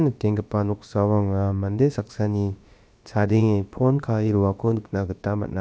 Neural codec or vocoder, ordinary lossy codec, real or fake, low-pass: codec, 16 kHz, about 1 kbps, DyCAST, with the encoder's durations; none; fake; none